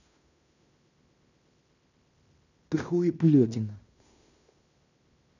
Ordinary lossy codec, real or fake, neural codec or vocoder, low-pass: none; fake; codec, 16 kHz in and 24 kHz out, 0.9 kbps, LongCat-Audio-Codec, fine tuned four codebook decoder; 7.2 kHz